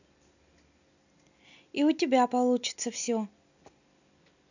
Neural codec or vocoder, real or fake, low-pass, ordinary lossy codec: none; real; 7.2 kHz; none